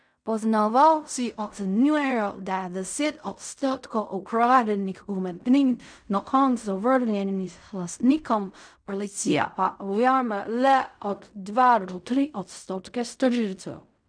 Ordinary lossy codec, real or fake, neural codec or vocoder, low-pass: none; fake; codec, 16 kHz in and 24 kHz out, 0.4 kbps, LongCat-Audio-Codec, fine tuned four codebook decoder; 9.9 kHz